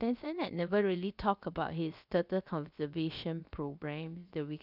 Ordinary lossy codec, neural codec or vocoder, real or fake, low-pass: none; codec, 16 kHz, about 1 kbps, DyCAST, with the encoder's durations; fake; 5.4 kHz